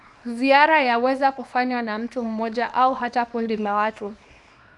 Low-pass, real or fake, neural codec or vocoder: 10.8 kHz; fake; codec, 24 kHz, 0.9 kbps, WavTokenizer, small release